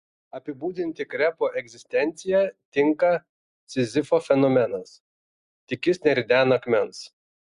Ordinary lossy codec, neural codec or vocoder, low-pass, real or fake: Opus, 64 kbps; none; 10.8 kHz; real